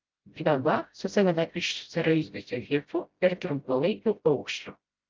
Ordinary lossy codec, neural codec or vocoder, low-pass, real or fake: Opus, 24 kbps; codec, 16 kHz, 0.5 kbps, FreqCodec, smaller model; 7.2 kHz; fake